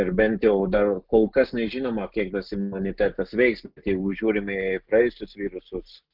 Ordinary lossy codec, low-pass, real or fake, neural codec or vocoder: Opus, 32 kbps; 5.4 kHz; real; none